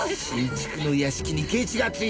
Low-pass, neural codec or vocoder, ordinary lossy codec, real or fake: none; none; none; real